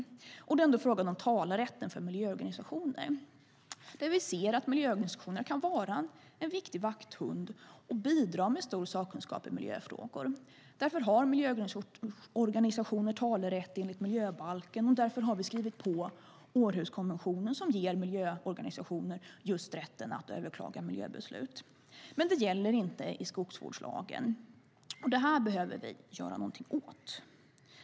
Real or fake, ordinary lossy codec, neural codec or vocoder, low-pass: real; none; none; none